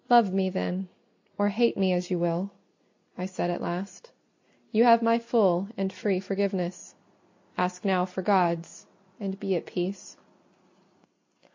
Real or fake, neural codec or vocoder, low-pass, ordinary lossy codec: real; none; 7.2 kHz; MP3, 48 kbps